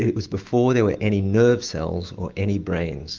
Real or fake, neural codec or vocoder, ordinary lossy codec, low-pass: fake; autoencoder, 48 kHz, 128 numbers a frame, DAC-VAE, trained on Japanese speech; Opus, 24 kbps; 7.2 kHz